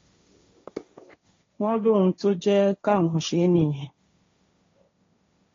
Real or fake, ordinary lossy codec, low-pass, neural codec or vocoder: fake; AAC, 32 kbps; 7.2 kHz; codec, 16 kHz, 1.1 kbps, Voila-Tokenizer